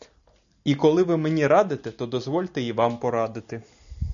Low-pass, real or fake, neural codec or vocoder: 7.2 kHz; real; none